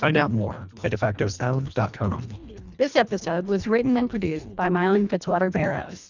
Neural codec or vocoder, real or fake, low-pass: codec, 24 kHz, 1.5 kbps, HILCodec; fake; 7.2 kHz